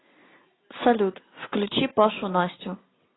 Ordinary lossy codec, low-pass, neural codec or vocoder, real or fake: AAC, 16 kbps; 7.2 kHz; none; real